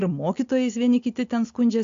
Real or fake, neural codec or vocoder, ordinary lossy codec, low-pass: real; none; AAC, 48 kbps; 7.2 kHz